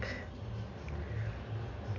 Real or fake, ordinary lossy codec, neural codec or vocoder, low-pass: real; none; none; 7.2 kHz